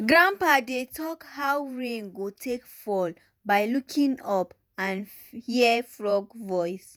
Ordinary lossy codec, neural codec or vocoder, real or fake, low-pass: none; vocoder, 48 kHz, 128 mel bands, Vocos; fake; none